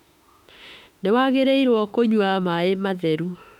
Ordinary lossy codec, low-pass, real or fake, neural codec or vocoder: none; 19.8 kHz; fake; autoencoder, 48 kHz, 32 numbers a frame, DAC-VAE, trained on Japanese speech